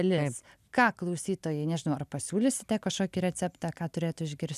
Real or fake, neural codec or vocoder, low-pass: real; none; 14.4 kHz